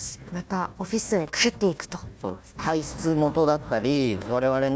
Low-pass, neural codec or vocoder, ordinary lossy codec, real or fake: none; codec, 16 kHz, 1 kbps, FunCodec, trained on Chinese and English, 50 frames a second; none; fake